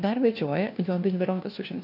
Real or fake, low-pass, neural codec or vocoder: fake; 5.4 kHz; codec, 16 kHz, 1 kbps, FunCodec, trained on LibriTTS, 50 frames a second